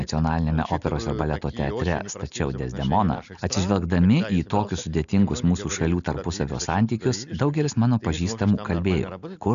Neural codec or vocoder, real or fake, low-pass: none; real; 7.2 kHz